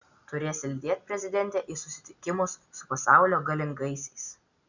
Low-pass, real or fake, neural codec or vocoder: 7.2 kHz; real; none